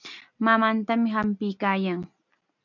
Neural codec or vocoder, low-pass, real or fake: none; 7.2 kHz; real